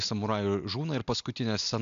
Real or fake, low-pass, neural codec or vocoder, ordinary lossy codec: real; 7.2 kHz; none; MP3, 64 kbps